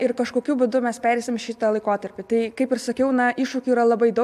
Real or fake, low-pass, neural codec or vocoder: real; 14.4 kHz; none